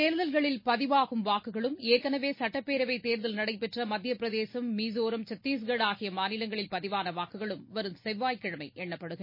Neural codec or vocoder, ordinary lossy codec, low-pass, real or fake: none; MP3, 24 kbps; 5.4 kHz; real